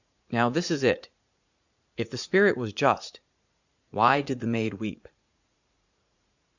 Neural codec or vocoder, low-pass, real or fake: vocoder, 44.1 kHz, 80 mel bands, Vocos; 7.2 kHz; fake